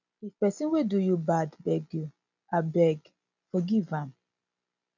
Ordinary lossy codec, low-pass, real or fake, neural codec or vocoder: none; 7.2 kHz; real; none